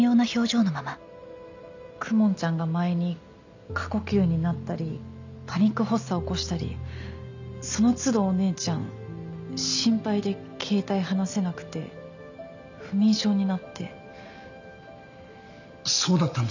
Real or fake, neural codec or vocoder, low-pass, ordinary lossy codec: real; none; 7.2 kHz; none